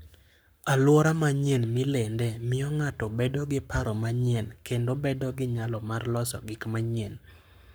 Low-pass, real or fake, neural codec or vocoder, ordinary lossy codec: none; fake; codec, 44.1 kHz, 7.8 kbps, Pupu-Codec; none